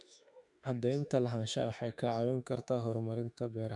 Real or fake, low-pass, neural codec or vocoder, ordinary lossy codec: fake; 10.8 kHz; autoencoder, 48 kHz, 32 numbers a frame, DAC-VAE, trained on Japanese speech; none